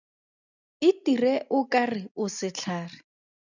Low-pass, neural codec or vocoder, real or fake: 7.2 kHz; none; real